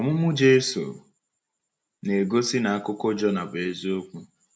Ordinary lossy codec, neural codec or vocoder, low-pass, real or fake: none; none; none; real